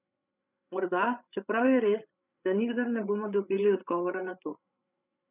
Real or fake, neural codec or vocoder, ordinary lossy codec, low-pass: fake; codec, 16 kHz, 8 kbps, FreqCodec, larger model; none; 3.6 kHz